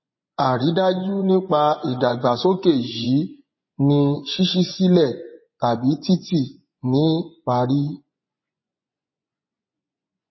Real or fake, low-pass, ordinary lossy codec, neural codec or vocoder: fake; 7.2 kHz; MP3, 24 kbps; vocoder, 24 kHz, 100 mel bands, Vocos